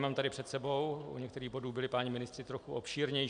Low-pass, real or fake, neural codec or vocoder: 9.9 kHz; real; none